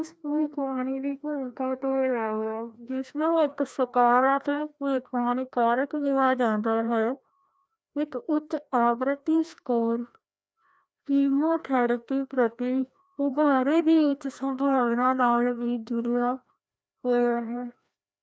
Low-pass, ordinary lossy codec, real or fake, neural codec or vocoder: none; none; fake; codec, 16 kHz, 1 kbps, FreqCodec, larger model